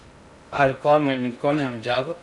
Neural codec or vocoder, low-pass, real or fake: codec, 16 kHz in and 24 kHz out, 0.6 kbps, FocalCodec, streaming, 2048 codes; 10.8 kHz; fake